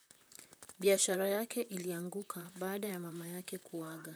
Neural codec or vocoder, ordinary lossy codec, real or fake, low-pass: vocoder, 44.1 kHz, 128 mel bands, Pupu-Vocoder; none; fake; none